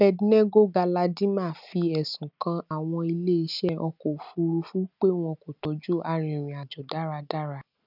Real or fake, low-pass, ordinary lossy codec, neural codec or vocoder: real; 5.4 kHz; none; none